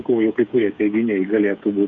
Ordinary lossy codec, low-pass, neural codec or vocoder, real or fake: MP3, 96 kbps; 7.2 kHz; codec, 16 kHz, 8 kbps, FreqCodec, smaller model; fake